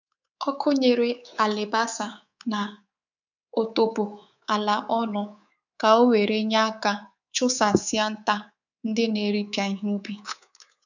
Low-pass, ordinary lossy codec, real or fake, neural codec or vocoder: 7.2 kHz; none; fake; codec, 24 kHz, 3.1 kbps, DualCodec